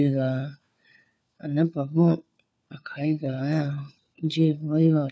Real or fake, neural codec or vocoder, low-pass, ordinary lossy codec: fake; codec, 16 kHz, 4 kbps, FunCodec, trained on LibriTTS, 50 frames a second; none; none